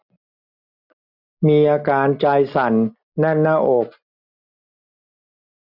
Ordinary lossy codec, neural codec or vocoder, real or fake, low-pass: none; none; real; 5.4 kHz